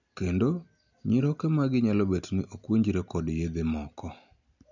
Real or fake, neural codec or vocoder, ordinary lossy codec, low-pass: real; none; none; 7.2 kHz